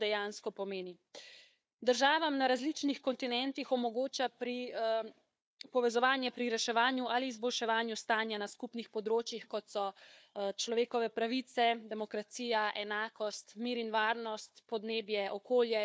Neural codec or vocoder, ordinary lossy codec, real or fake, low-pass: codec, 16 kHz, 4 kbps, FunCodec, trained on Chinese and English, 50 frames a second; none; fake; none